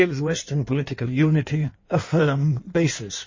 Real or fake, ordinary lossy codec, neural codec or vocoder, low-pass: fake; MP3, 32 kbps; codec, 16 kHz in and 24 kHz out, 1.1 kbps, FireRedTTS-2 codec; 7.2 kHz